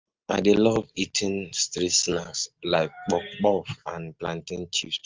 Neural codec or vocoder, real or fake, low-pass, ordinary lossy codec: none; real; 7.2 kHz; Opus, 24 kbps